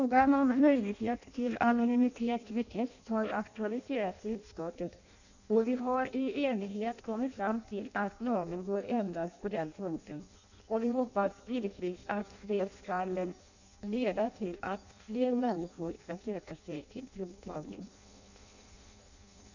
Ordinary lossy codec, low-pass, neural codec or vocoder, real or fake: none; 7.2 kHz; codec, 16 kHz in and 24 kHz out, 0.6 kbps, FireRedTTS-2 codec; fake